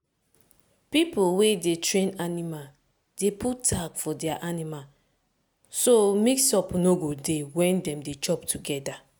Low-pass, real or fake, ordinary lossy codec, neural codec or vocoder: none; real; none; none